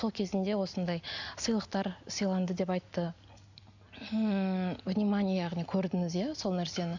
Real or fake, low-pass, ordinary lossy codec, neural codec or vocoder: real; 7.2 kHz; none; none